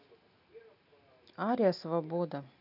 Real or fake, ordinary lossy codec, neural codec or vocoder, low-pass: real; none; none; 5.4 kHz